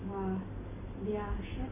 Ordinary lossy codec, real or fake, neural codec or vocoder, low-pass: MP3, 24 kbps; real; none; 3.6 kHz